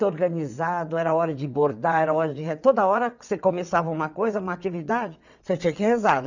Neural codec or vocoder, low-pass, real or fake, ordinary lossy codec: vocoder, 22.05 kHz, 80 mel bands, WaveNeXt; 7.2 kHz; fake; none